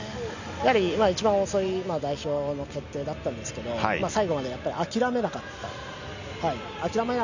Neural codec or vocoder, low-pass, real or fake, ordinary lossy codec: none; 7.2 kHz; real; none